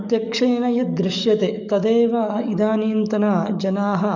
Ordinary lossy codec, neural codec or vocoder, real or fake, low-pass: none; codec, 44.1 kHz, 7.8 kbps, DAC; fake; 7.2 kHz